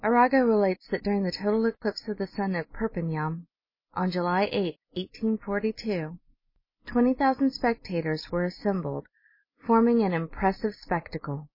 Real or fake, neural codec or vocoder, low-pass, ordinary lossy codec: real; none; 5.4 kHz; MP3, 24 kbps